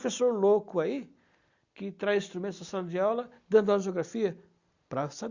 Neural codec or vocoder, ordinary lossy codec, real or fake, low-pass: none; Opus, 64 kbps; real; 7.2 kHz